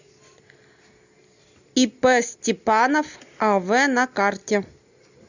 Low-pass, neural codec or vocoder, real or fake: 7.2 kHz; none; real